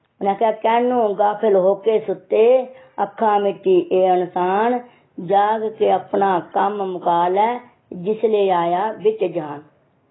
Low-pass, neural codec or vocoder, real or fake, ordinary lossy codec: 7.2 kHz; none; real; AAC, 16 kbps